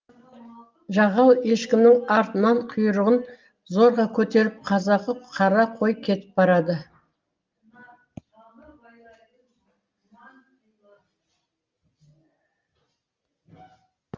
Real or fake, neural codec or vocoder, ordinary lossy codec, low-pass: real; none; Opus, 32 kbps; 7.2 kHz